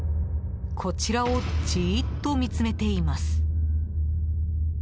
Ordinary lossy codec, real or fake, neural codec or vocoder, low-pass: none; real; none; none